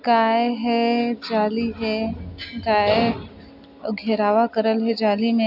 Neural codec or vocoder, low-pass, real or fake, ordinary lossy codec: none; 5.4 kHz; real; AAC, 48 kbps